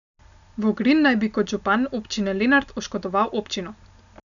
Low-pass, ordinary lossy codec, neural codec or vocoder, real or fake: 7.2 kHz; none; none; real